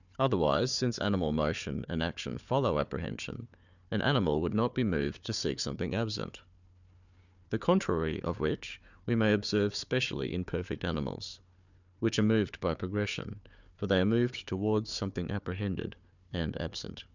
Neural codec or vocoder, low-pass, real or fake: codec, 16 kHz, 4 kbps, FunCodec, trained on Chinese and English, 50 frames a second; 7.2 kHz; fake